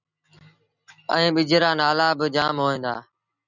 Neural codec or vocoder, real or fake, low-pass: none; real; 7.2 kHz